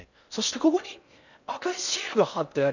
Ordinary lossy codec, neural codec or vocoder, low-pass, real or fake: none; codec, 16 kHz in and 24 kHz out, 0.6 kbps, FocalCodec, streaming, 4096 codes; 7.2 kHz; fake